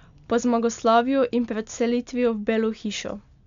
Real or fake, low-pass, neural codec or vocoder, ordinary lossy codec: real; 7.2 kHz; none; MP3, 64 kbps